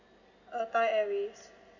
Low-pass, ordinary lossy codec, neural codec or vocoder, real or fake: 7.2 kHz; none; none; real